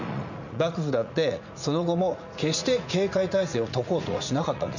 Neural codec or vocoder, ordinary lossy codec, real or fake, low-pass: vocoder, 44.1 kHz, 80 mel bands, Vocos; none; fake; 7.2 kHz